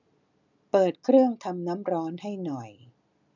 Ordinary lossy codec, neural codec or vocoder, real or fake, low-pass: none; none; real; 7.2 kHz